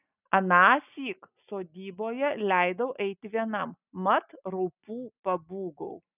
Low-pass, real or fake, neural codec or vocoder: 3.6 kHz; real; none